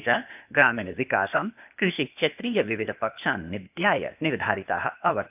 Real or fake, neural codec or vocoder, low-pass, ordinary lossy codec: fake; codec, 16 kHz, 0.8 kbps, ZipCodec; 3.6 kHz; none